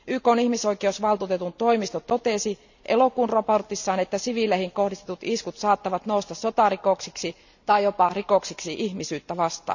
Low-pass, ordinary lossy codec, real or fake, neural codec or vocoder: 7.2 kHz; none; real; none